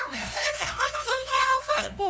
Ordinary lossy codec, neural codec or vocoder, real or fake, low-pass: none; codec, 16 kHz, 0.5 kbps, FunCodec, trained on LibriTTS, 25 frames a second; fake; none